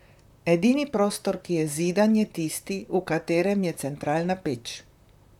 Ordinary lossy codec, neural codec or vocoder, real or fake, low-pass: none; vocoder, 44.1 kHz, 128 mel bands, Pupu-Vocoder; fake; 19.8 kHz